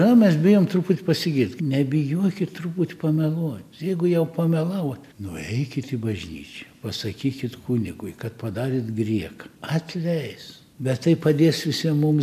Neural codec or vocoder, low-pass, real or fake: none; 14.4 kHz; real